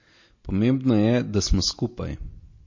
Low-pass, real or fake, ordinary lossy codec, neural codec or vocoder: 7.2 kHz; real; MP3, 32 kbps; none